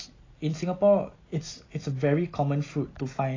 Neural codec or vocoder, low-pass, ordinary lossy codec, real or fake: none; 7.2 kHz; AAC, 32 kbps; real